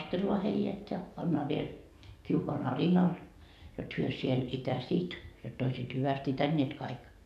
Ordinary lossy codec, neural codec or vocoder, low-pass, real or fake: none; codec, 44.1 kHz, 7.8 kbps, DAC; 14.4 kHz; fake